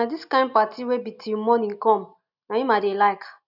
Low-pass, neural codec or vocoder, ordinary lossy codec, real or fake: 5.4 kHz; none; none; real